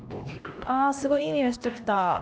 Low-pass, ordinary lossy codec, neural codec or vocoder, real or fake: none; none; codec, 16 kHz, 1 kbps, X-Codec, HuBERT features, trained on LibriSpeech; fake